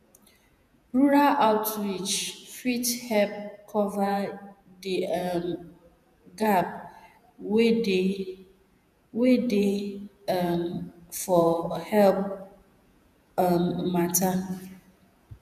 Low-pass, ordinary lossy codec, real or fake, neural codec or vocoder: 14.4 kHz; none; fake; vocoder, 48 kHz, 128 mel bands, Vocos